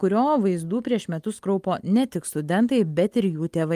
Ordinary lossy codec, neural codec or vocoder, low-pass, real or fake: Opus, 32 kbps; none; 14.4 kHz; real